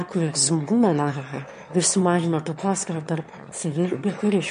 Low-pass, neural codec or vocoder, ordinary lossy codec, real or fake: 9.9 kHz; autoencoder, 22.05 kHz, a latent of 192 numbers a frame, VITS, trained on one speaker; MP3, 48 kbps; fake